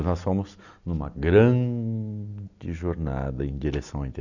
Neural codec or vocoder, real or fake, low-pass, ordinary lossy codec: none; real; 7.2 kHz; none